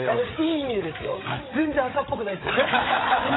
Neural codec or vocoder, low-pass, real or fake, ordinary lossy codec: codec, 16 kHz, 8 kbps, FreqCodec, larger model; 7.2 kHz; fake; AAC, 16 kbps